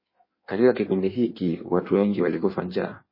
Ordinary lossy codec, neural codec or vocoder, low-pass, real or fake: MP3, 24 kbps; codec, 16 kHz in and 24 kHz out, 1.1 kbps, FireRedTTS-2 codec; 5.4 kHz; fake